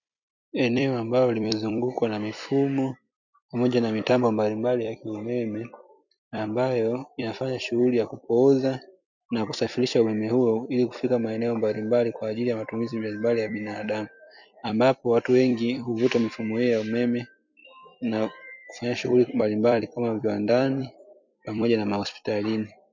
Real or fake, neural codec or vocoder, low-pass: fake; vocoder, 44.1 kHz, 128 mel bands every 256 samples, BigVGAN v2; 7.2 kHz